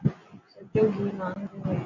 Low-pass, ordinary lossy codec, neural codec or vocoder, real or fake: 7.2 kHz; AAC, 48 kbps; none; real